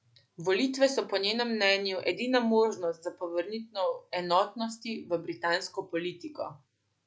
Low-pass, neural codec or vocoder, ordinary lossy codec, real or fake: none; none; none; real